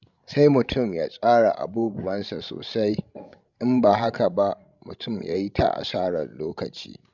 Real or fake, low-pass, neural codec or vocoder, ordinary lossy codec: fake; 7.2 kHz; codec, 16 kHz, 16 kbps, FreqCodec, larger model; none